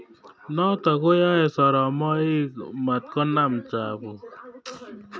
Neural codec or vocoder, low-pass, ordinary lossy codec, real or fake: none; none; none; real